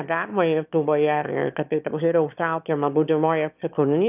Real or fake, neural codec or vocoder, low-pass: fake; autoencoder, 22.05 kHz, a latent of 192 numbers a frame, VITS, trained on one speaker; 3.6 kHz